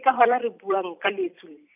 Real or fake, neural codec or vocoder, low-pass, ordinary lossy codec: fake; vocoder, 44.1 kHz, 128 mel bands every 256 samples, BigVGAN v2; 3.6 kHz; none